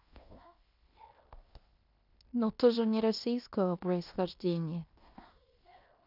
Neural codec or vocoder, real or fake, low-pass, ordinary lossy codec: codec, 16 kHz in and 24 kHz out, 0.9 kbps, LongCat-Audio-Codec, fine tuned four codebook decoder; fake; 5.4 kHz; none